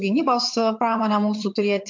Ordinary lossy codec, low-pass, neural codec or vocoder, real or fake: MP3, 48 kbps; 7.2 kHz; vocoder, 22.05 kHz, 80 mel bands, HiFi-GAN; fake